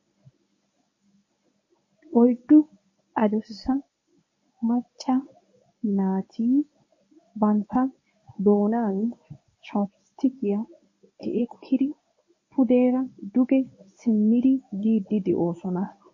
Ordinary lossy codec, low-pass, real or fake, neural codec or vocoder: MP3, 32 kbps; 7.2 kHz; fake; codec, 24 kHz, 0.9 kbps, WavTokenizer, medium speech release version 1